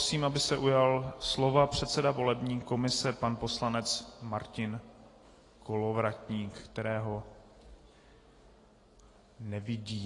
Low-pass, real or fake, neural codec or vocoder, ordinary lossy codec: 10.8 kHz; real; none; AAC, 32 kbps